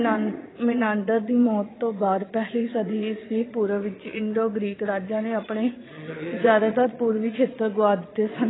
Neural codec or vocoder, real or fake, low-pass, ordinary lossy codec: vocoder, 22.05 kHz, 80 mel bands, Vocos; fake; 7.2 kHz; AAC, 16 kbps